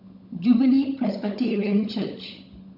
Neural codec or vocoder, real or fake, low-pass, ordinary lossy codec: codec, 16 kHz, 16 kbps, FunCodec, trained on LibriTTS, 50 frames a second; fake; 5.4 kHz; none